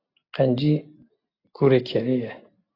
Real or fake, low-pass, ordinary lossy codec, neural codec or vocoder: real; 5.4 kHz; AAC, 32 kbps; none